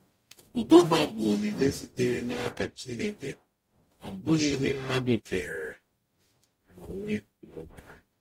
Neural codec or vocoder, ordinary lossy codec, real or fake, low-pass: codec, 44.1 kHz, 0.9 kbps, DAC; AAC, 48 kbps; fake; 19.8 kHz